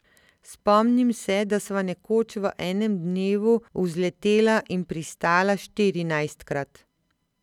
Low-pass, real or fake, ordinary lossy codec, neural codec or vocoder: 19.8 kHz; real; none; none